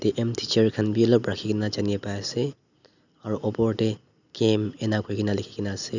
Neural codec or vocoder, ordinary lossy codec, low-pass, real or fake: none; none; 7.2 kHz; real